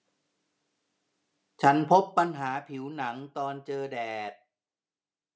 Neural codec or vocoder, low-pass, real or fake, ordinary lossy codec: none; none; real; none